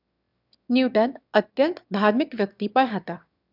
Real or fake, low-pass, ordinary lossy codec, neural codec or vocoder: fake; 5.4 kHz; none; autoencoder, 22.05 kHz, a latent of 192 numbers a frame, VITS, trained on one speaker